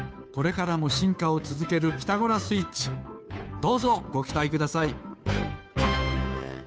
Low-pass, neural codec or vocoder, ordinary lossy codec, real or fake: none; codec, 16 kHz, 2 kbps, FunCodec, trained on Chinese and English, 25 frames a second; none; fake